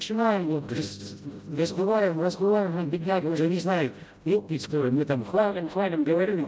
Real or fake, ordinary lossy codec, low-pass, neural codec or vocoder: fake; none; none; codec, 16 kHz, 0.5 kbps, FreqCodec, smaller model